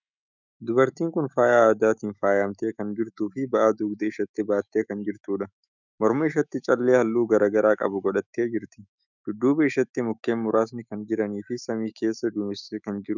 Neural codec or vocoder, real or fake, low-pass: autoencoder, 48 kHz, 128 numbers a frame, DAC-VAE, trained on Japanese speech; fake; 7.2 kHz